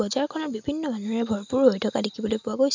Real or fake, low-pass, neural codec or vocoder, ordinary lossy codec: real; 7.2 kHz; none; MP3, 64 kbps